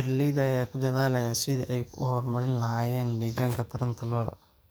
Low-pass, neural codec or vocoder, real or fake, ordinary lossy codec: none; codec, 44.1 kHz, 2.6 kbps, SNAC; fake; none